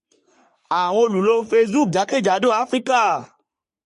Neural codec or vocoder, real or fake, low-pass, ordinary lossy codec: codec, 44.1 kHz, 3.4 kbps, Pupu-Codec; fake; 14.4 kHz; MP3, 48 kbps